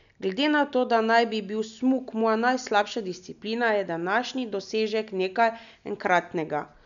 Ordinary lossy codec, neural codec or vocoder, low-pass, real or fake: none; none; 7.2 kHz; real